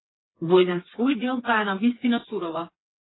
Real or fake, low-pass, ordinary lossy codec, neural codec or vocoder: fake; 7.2 kHz; AAC, 16 kbps; codec, 16 kHz, 4 kbps, FreqCodec, smaller model